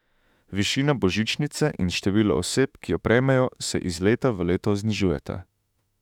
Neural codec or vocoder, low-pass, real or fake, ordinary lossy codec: autoencoder, 48 kHz, 32 numbers a frame, DAC-VAE, trained on Japanese speech; 19.8 kHz; fake; none